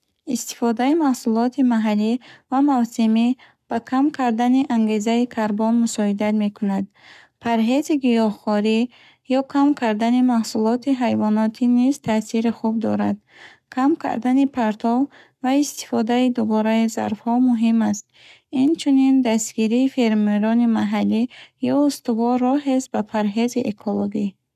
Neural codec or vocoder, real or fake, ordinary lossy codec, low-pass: codec, 44.1 kHz, 7.8 kbps, Pupu-Codec; fake; none; 14.4 kHz